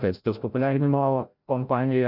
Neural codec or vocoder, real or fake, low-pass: codec, 16 kHz, 0.5 kbps, FreqCodec, larger model; fake; 5.4 kHz